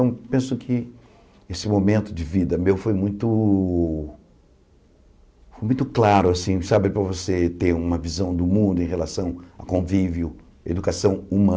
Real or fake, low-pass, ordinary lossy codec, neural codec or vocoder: real; none; none; none